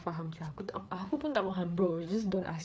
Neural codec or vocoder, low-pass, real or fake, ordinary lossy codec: codec, 16 kHz, 4 kbps, FreqCodec, larger model; none; fake; none